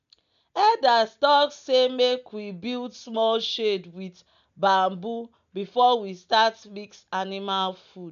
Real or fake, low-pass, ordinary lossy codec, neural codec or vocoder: real; 7.2 kHz; none; none